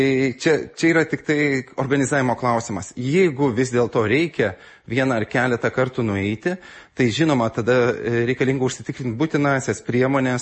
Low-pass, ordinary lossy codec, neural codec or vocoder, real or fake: 10.8 kHz; MP3, 32 kbps; none; real